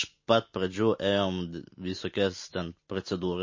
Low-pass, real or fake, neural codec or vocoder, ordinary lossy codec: 7.2 kHz; real; none; MP3, 32 kbps